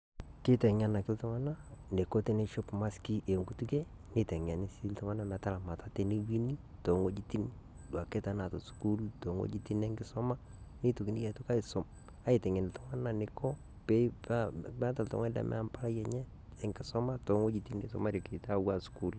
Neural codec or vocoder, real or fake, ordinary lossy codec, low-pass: none; real; none; none